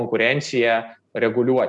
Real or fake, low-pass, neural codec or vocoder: real; 10.8 kHz; none